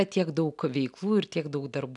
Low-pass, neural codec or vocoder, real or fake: 10.8 kHz; none; real